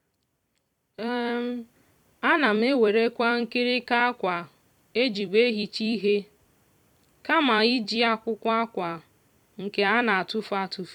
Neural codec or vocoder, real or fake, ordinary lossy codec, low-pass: vocoder, 44.1 kHz, 128 mel bands every 256 samples, BigVGAN v2; fake; none; 19.8 kHz